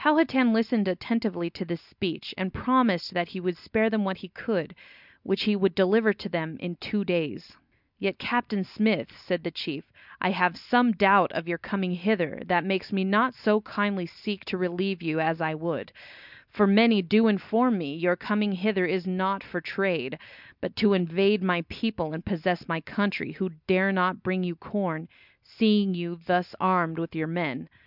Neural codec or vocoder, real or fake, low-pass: none; real; 5.4 kHz